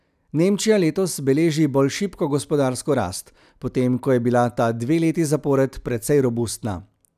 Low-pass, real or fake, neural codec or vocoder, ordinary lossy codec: 14.4 kHz; real; none; none